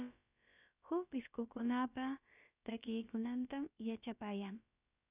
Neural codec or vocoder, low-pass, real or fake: codec, 16 kHz, about 1 kbps, DyCAST, with the encoder's durations; 3.6 kHz; fake